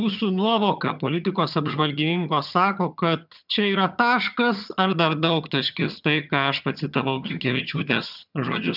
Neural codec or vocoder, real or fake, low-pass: vocoder, 22.05 kHz, 80 mel bands, HiFi-GAN; fake; 5.4 kHz